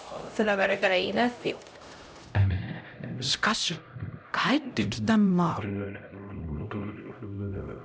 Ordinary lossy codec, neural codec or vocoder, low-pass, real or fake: none; codec, 16 kHz, 0.5 kbps, X-Codec, HuBERT features, trained on LibriSpeech; none; fake